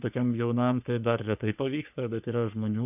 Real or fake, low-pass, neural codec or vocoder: fake; 3.6 kHz; codec, 44.1 kHz, 3.4 kbps, Pupu-Codec